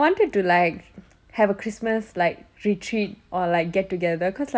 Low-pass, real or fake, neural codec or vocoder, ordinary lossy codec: none; real; none; none